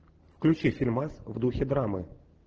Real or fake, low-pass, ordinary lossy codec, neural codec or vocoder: real; 7.2 kHz; Opus, 16 kbps; none